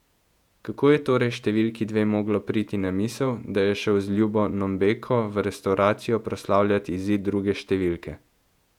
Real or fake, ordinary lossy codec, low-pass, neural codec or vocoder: fake; none; 19.8 kHz; vocoder, 48 kHz, 128 mel bands, Vocos